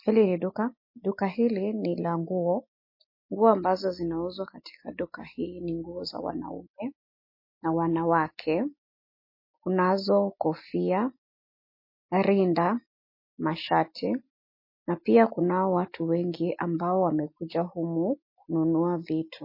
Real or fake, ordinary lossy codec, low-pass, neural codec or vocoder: real; MP3, 24 kbps; 5.4 kHz; none